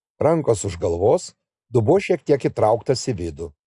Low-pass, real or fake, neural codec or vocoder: 10.8 kHz; real; none